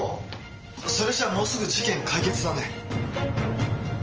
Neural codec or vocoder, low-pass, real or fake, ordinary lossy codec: none; 7.2 kHz; real; Opus, 24 kbps